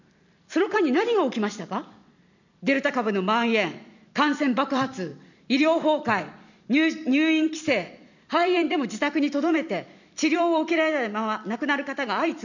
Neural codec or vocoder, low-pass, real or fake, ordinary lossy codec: vocoder, 44.1 kHz, 128 mel bands every 256 samples, BigVGAN v2; 7.2 kHz; fake; none